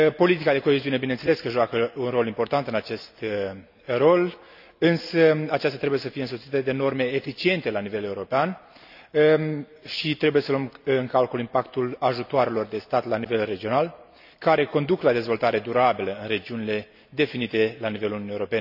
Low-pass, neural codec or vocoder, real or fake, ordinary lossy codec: 5.4 kHz; none; real; none